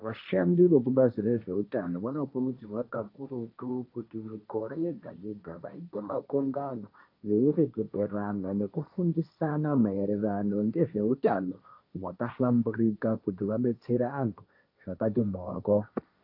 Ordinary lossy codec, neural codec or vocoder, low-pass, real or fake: AAC, 32 kbps; codec, 16 kHz, 1.1 kbps, Voila-Tokenizer; 5.4 kHz; fake